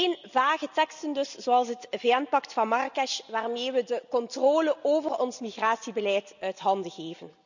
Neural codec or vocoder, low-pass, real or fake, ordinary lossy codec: none; 7.2 kHz; real; none